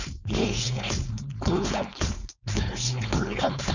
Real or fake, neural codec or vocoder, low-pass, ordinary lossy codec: fake; codec, 16 kHz, 4.8 kbps, FACodec; 7.2 kHz; none